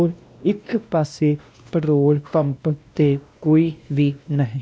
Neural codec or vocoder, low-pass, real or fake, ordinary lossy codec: codec, 16 kHz, 1 kbps, X-Codec, WavLM features, trained on Multilingual LibriSpeech; none; fake; none